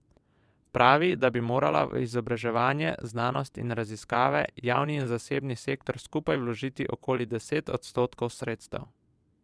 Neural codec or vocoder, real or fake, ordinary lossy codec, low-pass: vocoder, 22.05 kHz, 80 mel bands, WaveNeXt; fake; none; none